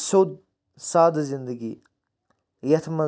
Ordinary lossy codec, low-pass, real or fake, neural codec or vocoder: none; none; real; none